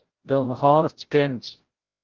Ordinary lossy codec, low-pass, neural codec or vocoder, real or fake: Opus, 16 kbps; 7.2 kHz; codec, 16 kHz, 0.5 kbps, FreqCodec, larger model; fake